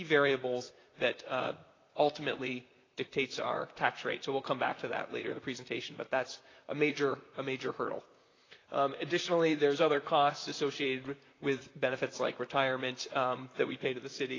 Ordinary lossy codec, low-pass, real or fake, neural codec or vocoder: AAC, 32 kbps; 7.2 kHz; fake; vocoder, 44.1 kHz, 128 mel bands, Pupu-Vocoder